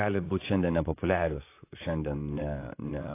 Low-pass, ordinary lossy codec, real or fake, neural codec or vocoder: 3.6 kHz; AAC, 24 kbps; fake; vocoder, 44.1 kHz, 128 mel bands, Pupu-Vocoder